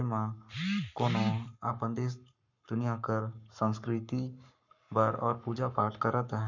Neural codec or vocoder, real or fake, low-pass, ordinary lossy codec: codec, 44.1 kHz, 7.8 kbps, Pupu-Codec; fake; 7.2 kHz; none